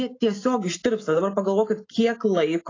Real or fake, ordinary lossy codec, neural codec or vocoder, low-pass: real; AAC, 32 kbps; none; 7.2 kHz